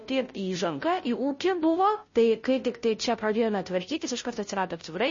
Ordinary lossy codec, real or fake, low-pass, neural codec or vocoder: MP3, 32 kbps; fake; 7.2 kHz; codec, 16 kHz, 0.5 kbps, FunCodec, trained on Chinese and English, 25 frames a second